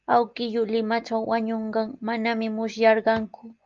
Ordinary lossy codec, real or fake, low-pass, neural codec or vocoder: Opus, 32 kbps; real; 7.2 kHz; none